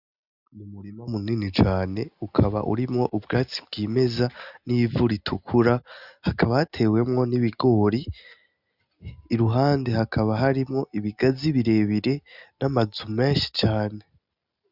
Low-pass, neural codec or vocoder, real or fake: 5.4 kHz; none; real